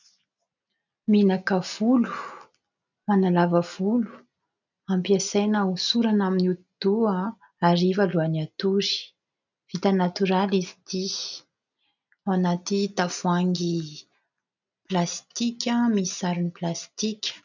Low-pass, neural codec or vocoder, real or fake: 7.2 kHz; none; real